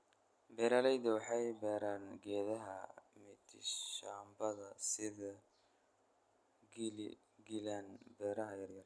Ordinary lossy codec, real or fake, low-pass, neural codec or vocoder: none; real; none; none